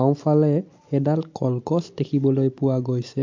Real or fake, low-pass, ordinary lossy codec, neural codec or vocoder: real; 7.2 kHz; MP3, 48 kbps; none